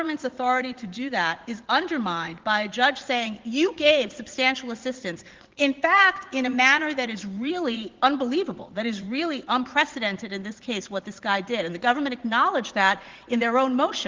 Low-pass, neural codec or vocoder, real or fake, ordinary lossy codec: 7.2 kHz; vocoder, 44.1 kHz, 80 mel bands, Vocos; fake; Opus, 16 kbps